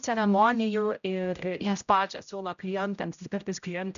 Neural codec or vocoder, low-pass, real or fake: codec, 16 kHz, 0.5 kbps, X-Codec, HuBERT features, trained on general audio; 7.2 kHz; fake